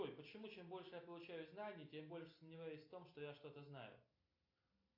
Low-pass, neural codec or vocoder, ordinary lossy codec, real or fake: 5.4 kHz; none; AAC, 32 kbps; real